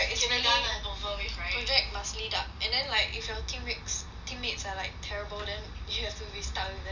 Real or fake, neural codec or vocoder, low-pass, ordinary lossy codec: real; none; 7.2 kHz; none